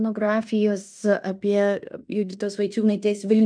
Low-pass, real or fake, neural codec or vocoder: 9.9 kHz; fake; codec, 16 kHz in and 24 kHz out, 0.9 kbps, LongCat-Audio-Codec, fine tuned four codebook decoder